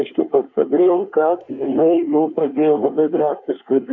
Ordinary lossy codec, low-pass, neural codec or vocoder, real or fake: MP3, 48 kbps; 7.2 kHz; codec, 24 kHz, 1 kbps, SNAC; fake